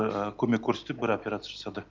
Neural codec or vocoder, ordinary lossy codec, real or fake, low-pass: none; Opus, 32 kbps; real; 7.2 kHz